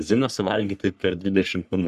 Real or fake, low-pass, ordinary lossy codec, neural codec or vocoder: fake; 14.4 kHz; Opus, 64 kbps; codec, 44.1 kHz, 3.4 kbps, Pupu-Codec